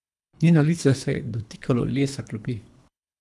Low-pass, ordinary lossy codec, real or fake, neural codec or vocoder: none; none; fake; codec, 24 kHz, 3 kbps, HILCodec